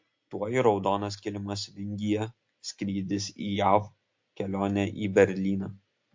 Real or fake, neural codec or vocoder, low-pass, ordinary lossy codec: real; none; 7.2 kHz; MP3, 48 kbps